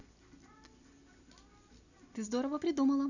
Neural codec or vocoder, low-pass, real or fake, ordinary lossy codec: none; 7.2 kHz; real; none